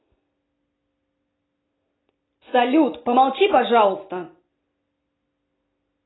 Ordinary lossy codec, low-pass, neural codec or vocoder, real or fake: AAC, 16 kbps; 7.2 kHz; none; real